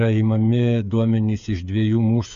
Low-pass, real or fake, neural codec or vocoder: 7.2 kHz; fake; codec, 16 kHz, 8 kbps, FreqCodec, smaller model